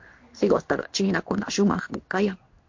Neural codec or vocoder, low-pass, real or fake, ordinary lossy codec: codec, 16 kHz in and 24 kHz out, 1 kbps, XY-Tokenizer; 7.2 kHz; fake; MP3, 48 kbps